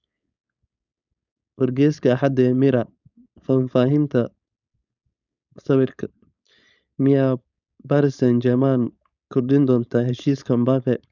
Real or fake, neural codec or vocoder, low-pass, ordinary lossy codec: fake; codec, 16 kHz, 4.8 kbps, FACodec; 7.2 kHz; none